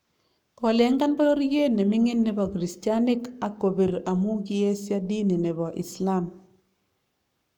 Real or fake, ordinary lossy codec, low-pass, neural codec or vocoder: fake; none; 19.8 kHz; codec, 44.1 kHz, 7.8 kbps, Pupu-Codec